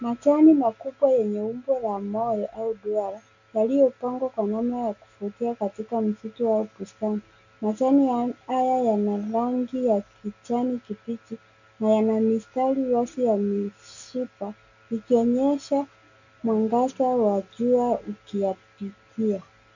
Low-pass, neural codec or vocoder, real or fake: 7.2 kHz; none; real